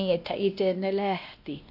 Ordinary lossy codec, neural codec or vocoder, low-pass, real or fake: AAC, 48 kbps; codec, 16 kHz, 1 kbps, X-Codec, WavLM features, trained on Multilingual LibriSpeech; 5.4 kHz; fake